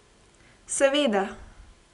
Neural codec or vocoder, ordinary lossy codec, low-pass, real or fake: none; none; 10.8 kHz; real